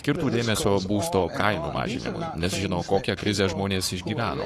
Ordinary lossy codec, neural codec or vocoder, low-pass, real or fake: AAC, 48 kbps; codec, 44.1 kHz, 7.8 kbps, Pupu-Codec; 14.4 kHz; fake